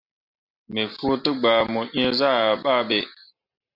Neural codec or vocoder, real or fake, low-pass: none; real; 5.4 kHz